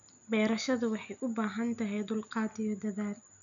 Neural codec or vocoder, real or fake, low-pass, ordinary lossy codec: none; real; 7.2 kHz; none